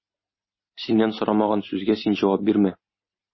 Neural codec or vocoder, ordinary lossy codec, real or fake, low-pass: none; MP3, 24 kbps; real; 7.2 kHz